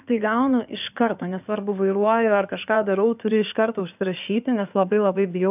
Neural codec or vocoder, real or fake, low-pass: codec, 16 kHz in and 24 kHz out, 2.2 kbps, FireRedTTS-2 codec; fake; 3.6 kHz